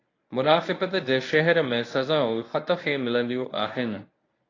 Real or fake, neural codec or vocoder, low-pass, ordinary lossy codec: fake; codec, 24 kHz, 0.9 kbps, WavTokenizer, medium speech release version 1; 7.2 kHz; AAC, 32 kbps